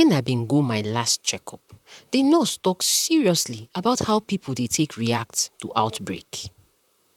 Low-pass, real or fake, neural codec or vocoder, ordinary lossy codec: 19.8 kHz; fake; vocoder, 44.1 kHz, 128 mel bands, Pupu-Vocoder; none